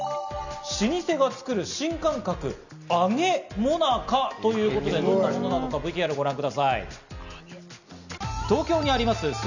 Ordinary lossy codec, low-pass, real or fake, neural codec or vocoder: none; 7.2 kHz; real; none